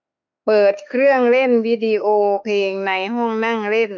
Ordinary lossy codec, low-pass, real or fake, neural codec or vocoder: none; 7.2 kHz; fake; autoencoder, 48 kHz, 32 numbers a frame, DAC-VAE, trained on Japanese speech